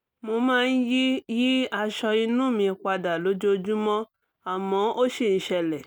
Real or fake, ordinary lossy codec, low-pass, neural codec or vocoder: real; none; none; none